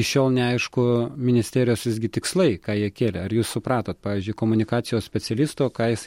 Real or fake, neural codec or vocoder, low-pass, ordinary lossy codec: real; none; 19.8 kHz; MP3, 64 kbps